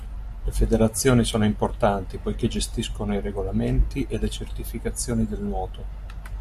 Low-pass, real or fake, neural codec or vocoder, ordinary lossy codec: 14.4 kHz; real; none; MP3, 64 kbps